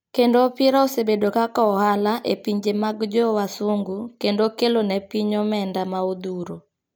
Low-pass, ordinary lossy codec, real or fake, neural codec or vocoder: none; none; real; none